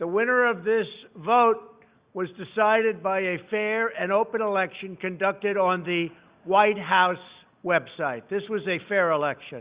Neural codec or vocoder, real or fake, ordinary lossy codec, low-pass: none; real; Opus, 64 kbps; 3.6 kHz